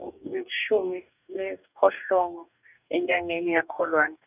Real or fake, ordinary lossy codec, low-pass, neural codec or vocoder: fake; none; 3.6 kHz; codec, 44.1 kHz, 2.6 kbps, DAC